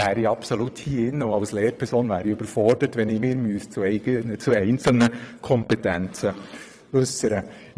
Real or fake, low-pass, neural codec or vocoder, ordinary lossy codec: fake; none; vocoder, 22.05 kHz, 80 mel bands, WaveNeXt; none